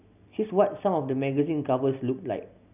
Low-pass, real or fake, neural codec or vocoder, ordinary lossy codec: 3.6 kHz; real; none; none